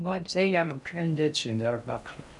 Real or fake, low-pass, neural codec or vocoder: fake; 10.8 kHz; codec, 16 kHz in and 24 kHz out, 0.6 kbps, FocalCodec, streaming, 2048 codes